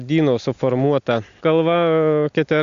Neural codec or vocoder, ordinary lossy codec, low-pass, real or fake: none; Opus, 64 kbps; 7.2 kHz; real